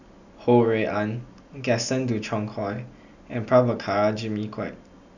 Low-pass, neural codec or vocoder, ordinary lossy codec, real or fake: 7.2 kHz; none; none; real